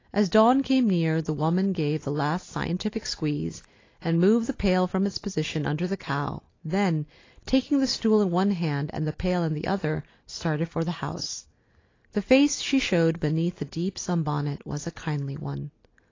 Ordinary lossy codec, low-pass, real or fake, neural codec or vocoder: AAC, 32 kbps; 7.2 kHz; real; none